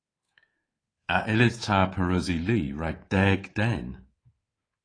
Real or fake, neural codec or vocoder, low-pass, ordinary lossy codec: fake; codec, 24 kHz, 3.1 kbps, DualCodec; 9.9 kHz; AAC, 32 kbps